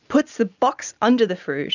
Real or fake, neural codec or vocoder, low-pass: real; none; 7.2 kHz